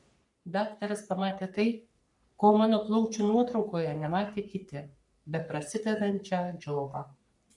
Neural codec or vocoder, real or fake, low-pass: codec, 44.1 kHz, 3.4 kbps, Pupu-Codec; fake; 10.8 kHz